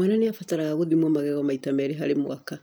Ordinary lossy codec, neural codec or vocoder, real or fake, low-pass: none; none; real; none